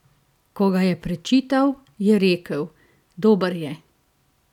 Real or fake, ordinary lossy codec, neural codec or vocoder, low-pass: real; none; none; 19.8 kHz